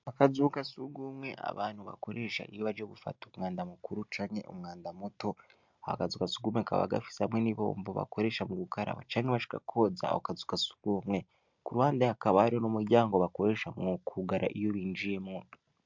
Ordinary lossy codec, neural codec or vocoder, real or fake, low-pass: MP3, 64 kbps; none; real; 7.2 kHz